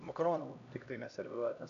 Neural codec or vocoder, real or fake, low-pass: codec, 16 kHz, 1 kbps, X-Codec, HuBERT features, trained on LibriSpeech; fake; 7.2 kHz